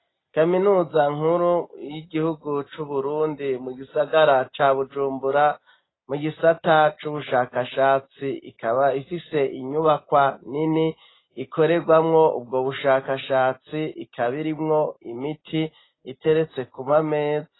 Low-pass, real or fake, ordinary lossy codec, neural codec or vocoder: 7.2 kHz; real; AAC, 16 kbps; none